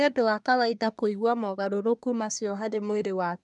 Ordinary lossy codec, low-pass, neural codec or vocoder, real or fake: none; 10.8 kHz; codec, 24 kHz, 1 kbps, SNAC; fake